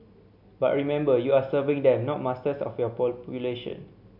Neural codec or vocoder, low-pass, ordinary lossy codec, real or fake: none; 5.4 kHz; MP3, 48 kbps; real